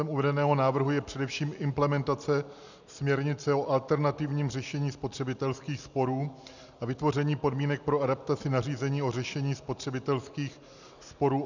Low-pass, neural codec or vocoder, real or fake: 7.2 kHz; none; real